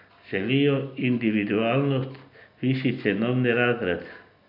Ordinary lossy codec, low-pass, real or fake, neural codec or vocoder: none; 5.4 kHz; real; none